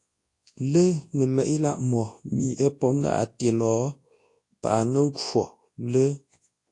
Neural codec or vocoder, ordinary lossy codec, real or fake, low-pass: codec, 24 kHz, 0.9 kbps, WavTokenizer, large speech release; AAC, 48 kbps; fake; 10.8 kHz